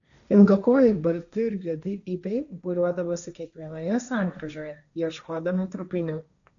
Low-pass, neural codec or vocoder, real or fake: 7.2 kHz; codec, 16 kHz, 1.1 kbps, Voila-Tokenizer; fake